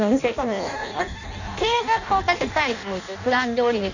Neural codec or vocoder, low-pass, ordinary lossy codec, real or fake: codec, 16 kHz in and 24 kHz out, 0.6 kbps, FireRedTTS-2 codec; 7.2 kHz; none; fake